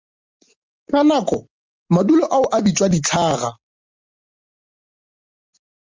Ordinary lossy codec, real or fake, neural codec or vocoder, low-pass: Opus, 32 kbps; real; none; 7.2 kHz